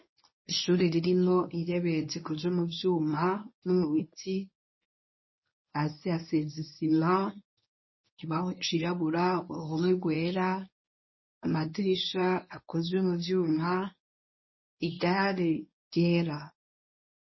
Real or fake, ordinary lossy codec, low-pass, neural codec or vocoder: fake; MP3, 24 kbps; 7.2 kHz; codec, 24 kHz, 0.9 kbps, WavTokenizer, medium speech release version 1